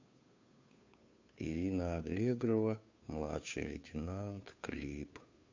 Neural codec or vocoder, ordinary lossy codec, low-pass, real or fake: codec, 16 kHz, 2 kbps, FunCodec, trained on Chinese and English, 25 frames a second; MP3, 64 kbps; 7.2 kHz; fake